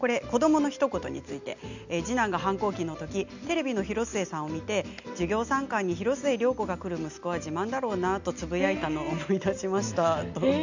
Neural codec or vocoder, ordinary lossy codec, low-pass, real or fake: none; none; 7.2 kHz; real